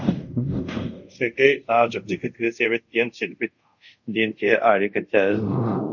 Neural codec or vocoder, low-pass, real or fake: codec, 24 kHz, 0.5 kbps, DualCodec; 7.2 kHz; fake